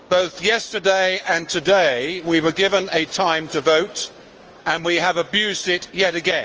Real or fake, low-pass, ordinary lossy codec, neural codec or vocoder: fake; 7.2 kHz; Opus, 24 kbps; codec, 16 kHz, 6 kbps, DAC